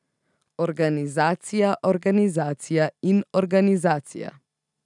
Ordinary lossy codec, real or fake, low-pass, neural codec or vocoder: none; real; 10.8 kHz; none